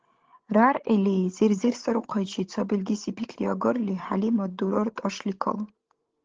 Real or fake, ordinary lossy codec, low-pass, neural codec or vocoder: fake; Opus, 16 kbps; 7.2 kHz; codec, 16 kHz, 16 kbps, FreqCodec, larger model